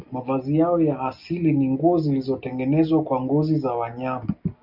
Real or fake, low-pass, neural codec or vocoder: real; 5.4 kHz; none